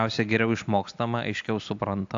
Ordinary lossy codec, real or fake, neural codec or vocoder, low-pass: AAC, 64 kbps; real; none; 7.2 kHz